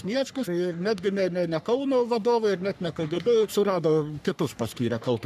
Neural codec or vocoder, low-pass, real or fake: codec, 44.1 kHz, 3.4 kbps, Pupu-Codec; 14.4 kHz; fake